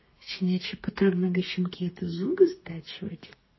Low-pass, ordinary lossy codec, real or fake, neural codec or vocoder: 7.2 kHz; MP3, 24 kbps; fake; codec, 32 kHz, 1.9 kbps, SNAC